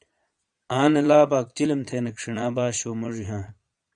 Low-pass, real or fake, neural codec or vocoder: 9.9 kHz; fake; vocoder, 22.05 kHz, 80 mel bands, Vocos